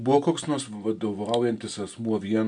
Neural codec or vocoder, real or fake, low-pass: none; real; 9.9 kHz